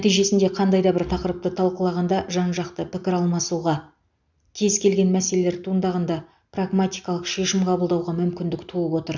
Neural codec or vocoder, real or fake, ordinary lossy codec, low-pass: none; real; none; 7.2 kHz